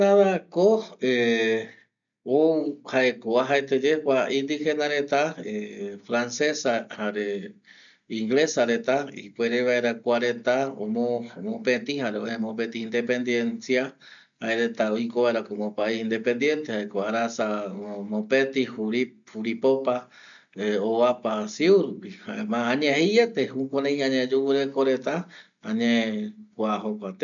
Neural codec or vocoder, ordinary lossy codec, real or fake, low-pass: none; none; real; 7.2 kHz